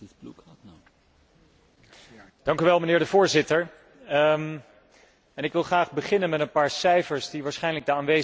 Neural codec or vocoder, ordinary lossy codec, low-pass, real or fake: none; none; none; real